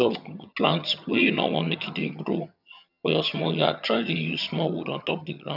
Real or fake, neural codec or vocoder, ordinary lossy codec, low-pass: fake; vocoder, 22.05 kHz, 80 mel bands, HiFi-GAN; none; 5.4 kHz